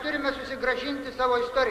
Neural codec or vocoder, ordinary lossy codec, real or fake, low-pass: none; AAC, 64 kbps; real; 14.4 kHz